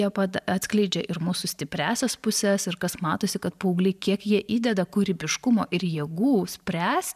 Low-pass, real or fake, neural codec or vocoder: 14.4 kHz; fake; vocoder, 44.1 kHz, 128 mel bands every 512 samples, BigVGAN v2